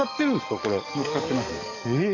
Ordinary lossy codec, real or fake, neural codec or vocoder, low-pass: none; fake; codec, 44.1 kHz, 7.8 kbps, DAC; 7.2 kHz